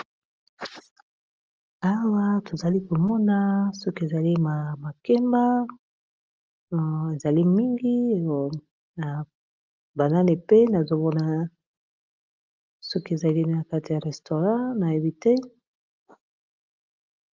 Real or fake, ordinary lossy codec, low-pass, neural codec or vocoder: real; Opus, 24 kbps; 7.2 kHz; none